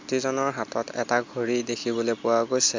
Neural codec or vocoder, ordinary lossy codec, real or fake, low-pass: none; MP3, 64 kbps; real; 7.2 kHz